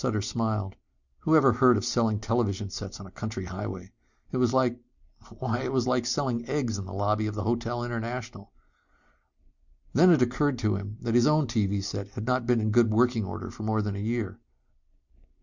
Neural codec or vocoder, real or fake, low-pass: none; real; 7.2 kHz